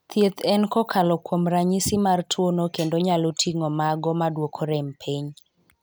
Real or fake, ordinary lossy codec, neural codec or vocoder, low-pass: real; none; none; none